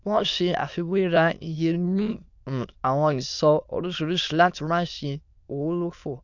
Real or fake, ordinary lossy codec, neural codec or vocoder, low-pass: fake; none; autoencoder, 22.05 kHz, a latent of 192 numbers a frame, VITS, trained on many speakers; 7.2 kHz